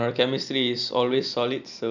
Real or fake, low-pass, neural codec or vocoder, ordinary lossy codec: real; 7.2 kHz; none; none